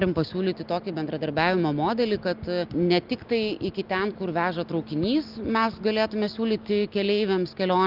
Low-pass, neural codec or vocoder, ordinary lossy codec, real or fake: 5.4 kHz; none; Opus, 24 kbps; real